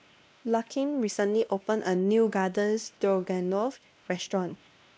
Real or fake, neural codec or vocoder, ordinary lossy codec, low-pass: fake; codec, 16 kHz, 1 kbps, X-Codec, WavLM features, trained on Multilingual LibriSpeech; none; none